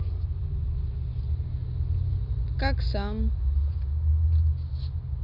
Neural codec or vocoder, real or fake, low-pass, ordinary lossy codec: none; real; 5.4 kHz; none